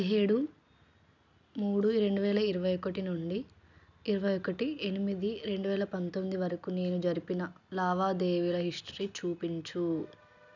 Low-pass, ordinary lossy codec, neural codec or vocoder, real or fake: 7.2 kHz; none; none; real